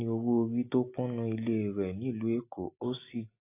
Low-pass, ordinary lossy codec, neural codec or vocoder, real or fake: 3.6 kHz; AAC, 24 kbps; none; real